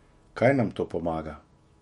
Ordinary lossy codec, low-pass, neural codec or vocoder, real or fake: MP3, 48 kbps; 19.8 kHz; autoencoder, 48 kHz, 128 numbers a frame, DAC-VAE, trained on Japanese speech; fake